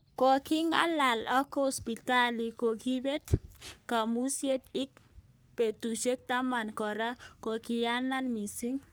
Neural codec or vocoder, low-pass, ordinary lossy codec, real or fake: codec, 44.1 kHz, 3.4 kbps, Pupu-Codec; none; none; fake